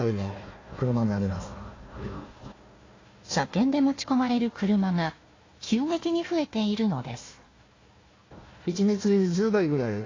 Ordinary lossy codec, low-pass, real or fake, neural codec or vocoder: AAC, 32 kbps; 7.2 kHz; fake; codec, 16 kHz, 1 kbps, FunCodec, trained on Chinese and English, 50 frames a second